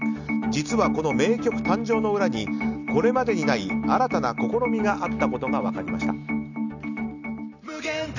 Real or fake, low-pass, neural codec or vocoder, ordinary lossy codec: real; 7.2 kHz; none; none